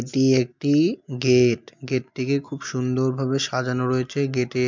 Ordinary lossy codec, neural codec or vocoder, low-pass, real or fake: MP3, 64 kbps; none; 7.2 kHz; real